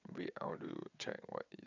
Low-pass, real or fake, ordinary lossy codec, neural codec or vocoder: 7.2 kHz; fake; none; vocoder, 22.05 kHz, 80 mel bands, WaveNeXt